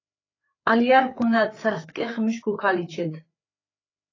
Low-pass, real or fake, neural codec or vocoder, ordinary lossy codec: 7.2 kHz; fake; codec, 16 kHz, 4 kbps, FreqCodec, larger model; AAC, 32 kbps